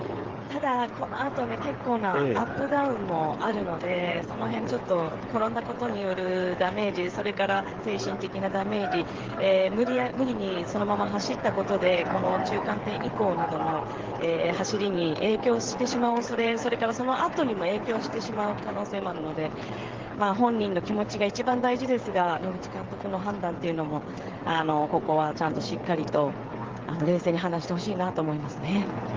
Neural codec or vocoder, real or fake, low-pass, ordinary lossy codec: codec, 16 kHz, 8 kbps, FreqCodec, smaller model; fake; 7.2 kHz; Opus, 16 kbps